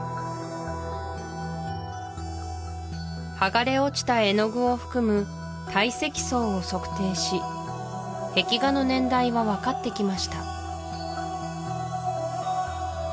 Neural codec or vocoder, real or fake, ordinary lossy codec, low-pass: none; real; none; none